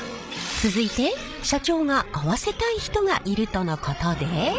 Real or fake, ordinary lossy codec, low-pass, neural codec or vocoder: fake; none; none; codec, 16 kHz, 16 kbps, FreqCodec, larger model